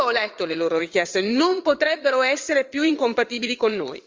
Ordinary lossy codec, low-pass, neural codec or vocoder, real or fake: Opus, 32 kbps; 7.2 kHz; vocoder, 22.05 kHz, 80 mel bands, WaveNeXt; fake